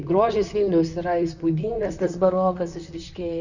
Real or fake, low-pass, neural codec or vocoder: fake; 7.2 kHz; vocoder, 44.1 kHz, 128 mel bands, Pupu-Vocoder